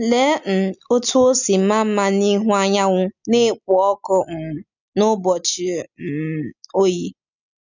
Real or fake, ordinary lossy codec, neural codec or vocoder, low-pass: real; none; none; 7.2 kHz